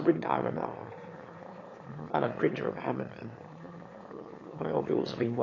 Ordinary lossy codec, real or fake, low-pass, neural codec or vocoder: AAC, 32 kbps; fake; 7.2 kHz; autoencoder, 22.05 kHz, a latent of 192 numbers a frame, VITS, trained on one speaker